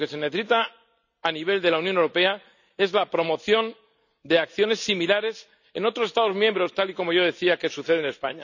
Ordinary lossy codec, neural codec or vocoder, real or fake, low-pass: none; none; real; 7.2 kHz